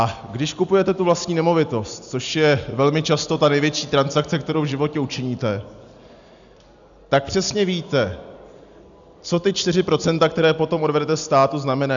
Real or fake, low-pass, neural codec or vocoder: real; 7.2 kHz; none